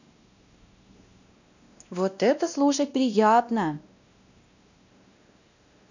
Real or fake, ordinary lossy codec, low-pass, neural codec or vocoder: fake; none; 7.2 kHz; codec, 16 kHz, 1 kbps, X-Codec, WavLM features, trained on Multilingual LibriSpeech